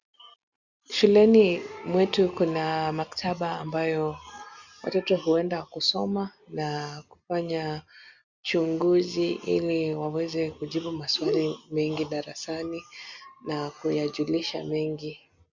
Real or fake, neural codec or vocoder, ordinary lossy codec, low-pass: real; none; Opus, 64 kbps; 7.2 kHz